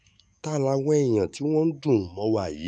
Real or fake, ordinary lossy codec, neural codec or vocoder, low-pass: fake; none; autoencoder, 48 kHz, 128 numbers a frame, DAC-VAE, trained on Japanese speech; 9.9 kHz